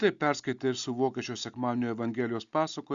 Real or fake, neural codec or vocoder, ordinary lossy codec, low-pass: real; none; Opus, 64 kbps; 7.2 kHz